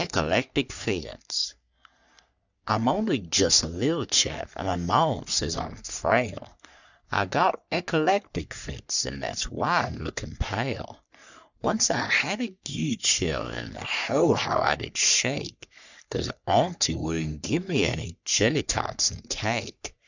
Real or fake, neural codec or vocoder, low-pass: fake; codec, 44.1 kHz, 3.4 kbps, Pupu-Codec; 7.2 kHz